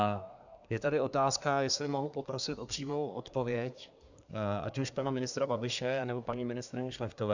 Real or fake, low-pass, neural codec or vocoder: fake; 7.2 kHz; codec, 24 kHz, 1 kbps, SNAC